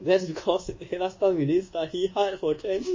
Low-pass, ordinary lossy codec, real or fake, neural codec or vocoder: 7.2 kHz; MP3, 32 kbps; fake; codec, 24 kHz, 1.2 kbps, DualCodec